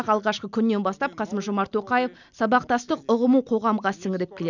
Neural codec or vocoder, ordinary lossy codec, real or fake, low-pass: none; none; real; 7.2 kHz